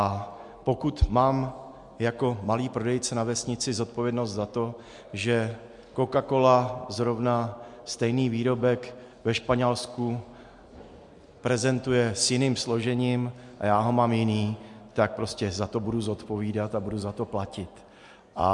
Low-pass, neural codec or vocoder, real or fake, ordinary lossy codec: 10.8 kHz; none; real; MP3, 64 kbps